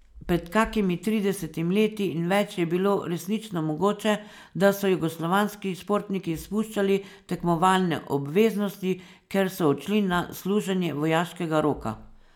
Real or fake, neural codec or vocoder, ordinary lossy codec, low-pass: real; none; none; 19.8 kHz